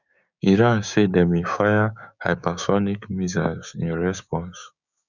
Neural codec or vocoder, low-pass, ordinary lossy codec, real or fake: codec, 16 kHz, 6 kbps, DAC; 7.2 kHz; none; fake